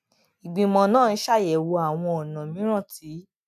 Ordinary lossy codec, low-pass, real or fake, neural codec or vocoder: none; 14.4 kHz; real; none